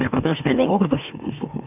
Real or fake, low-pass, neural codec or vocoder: fake; 3.6 kHz; autoencoder, 44.1 kHz, a latent of 192 numbers a frame, MeloTTS